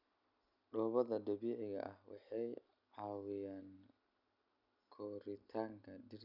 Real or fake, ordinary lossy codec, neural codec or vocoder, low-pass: real; none; none; 5.4 kHz